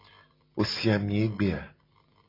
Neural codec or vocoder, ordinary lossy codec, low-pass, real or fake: none; AAC, 24 kbps; 5.4 kHz; real